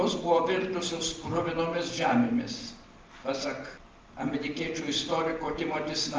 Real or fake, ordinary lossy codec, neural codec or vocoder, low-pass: real; Opus, 32 kbps; none; 7.2 kHz